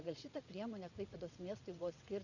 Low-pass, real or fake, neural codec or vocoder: 7.2 kHz; fake; vocoder, 22.05 kHz, 80 mel bands, WaveNeXt